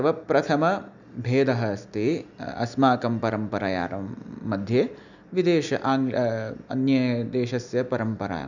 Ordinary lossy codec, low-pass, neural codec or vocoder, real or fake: none; 7.2 kHz; none; real